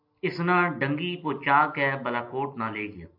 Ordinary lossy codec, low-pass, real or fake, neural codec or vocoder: AAC, 48 kbps; 5.4 kHz; real; none